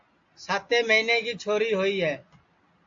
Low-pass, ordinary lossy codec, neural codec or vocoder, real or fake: 7.2 kHz; AAC, 48 kbps; none; real